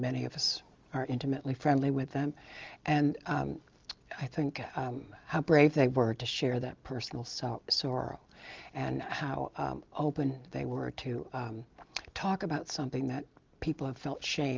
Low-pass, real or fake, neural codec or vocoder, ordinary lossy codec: 7.2 kHz; real; none; Opus, 32 kbps